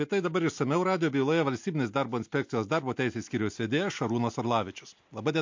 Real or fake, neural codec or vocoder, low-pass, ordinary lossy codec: real; none; 7.2 kHz; MP3, 48 kbps